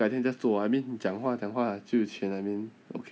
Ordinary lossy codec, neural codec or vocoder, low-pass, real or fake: none; none; none; real